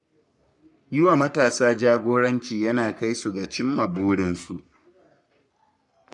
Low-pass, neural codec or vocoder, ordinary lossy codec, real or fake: 10.8 kHz; codec, 44.1 kHz, 3.4 kbps, Pupu-Codec; none; fake